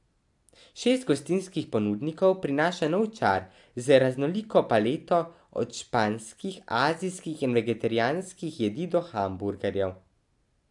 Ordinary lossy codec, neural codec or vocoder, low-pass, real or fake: AAC, 64 kbps; none; 10.8 kHz; real